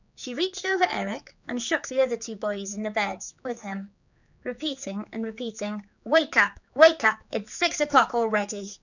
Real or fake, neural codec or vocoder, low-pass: fake; codec, 16 kHz, 4 kbps, X-Codec, HuBERT features, trained on general audio; 7.2 kHz